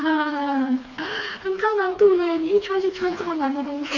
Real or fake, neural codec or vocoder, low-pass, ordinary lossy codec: fake; codec, 16 kHz, 2 kbps, FreqCodec, smaller model; 7.2 kHz; Opus, 64 kbps